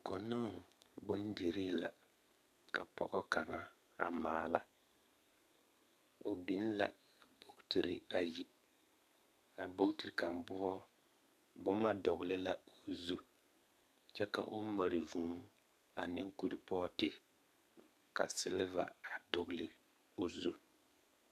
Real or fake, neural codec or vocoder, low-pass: fake; codec, 32 kHz, 1.9 kbps, SNAC; 14.4 kHz